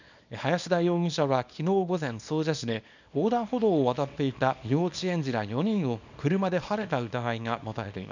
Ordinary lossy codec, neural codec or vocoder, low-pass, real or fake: none; codec, 24 kHz, 0.9 kbps, WavTokenizer, small release; 7.2 kHz; fake